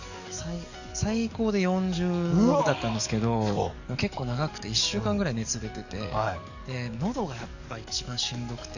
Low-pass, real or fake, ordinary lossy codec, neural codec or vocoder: 7.2 kHz; fake; none; codec, 44.1 kHz, 7.8 kbps, DAC